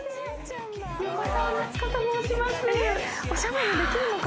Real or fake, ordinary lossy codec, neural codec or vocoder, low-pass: real; none; none; none